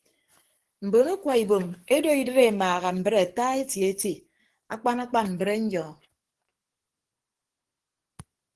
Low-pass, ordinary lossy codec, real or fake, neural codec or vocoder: 10.8 kHz; Opus, 16 kbps; fake; codec, 44.1 kHz, 7.8 kbps, DAC